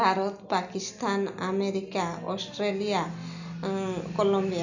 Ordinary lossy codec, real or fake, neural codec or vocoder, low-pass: none; real; none; 7.2 kHz